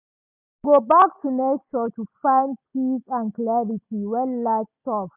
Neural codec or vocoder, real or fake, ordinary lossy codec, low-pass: none; real; none; 3.6 kHz